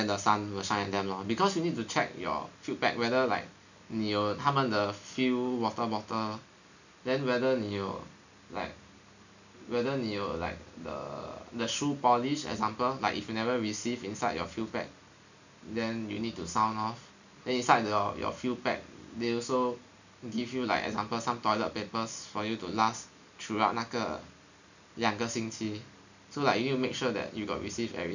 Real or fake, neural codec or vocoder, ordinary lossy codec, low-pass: real; none; none; 7.2 kHz